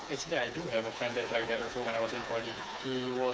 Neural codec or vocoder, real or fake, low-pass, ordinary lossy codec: codec, 16 kHz, 4 kbps, FreqCodec, smaller model; fake; none; none